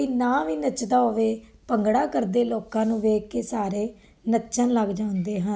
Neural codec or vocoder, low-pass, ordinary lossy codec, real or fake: none; none; none; real